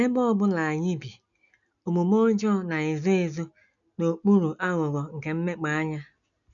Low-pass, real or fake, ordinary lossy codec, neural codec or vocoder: 7.2 kHz; real; none; none